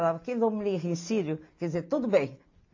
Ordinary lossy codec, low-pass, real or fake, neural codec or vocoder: AAC, 48 kbps; 7.2 kHz; real; none